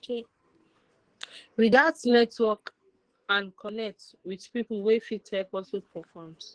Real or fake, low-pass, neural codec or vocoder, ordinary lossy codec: fake; 9.9 kHz; codec, 44.1 kHz, 2.6 kbps, SNAC; Opus, 16 kbps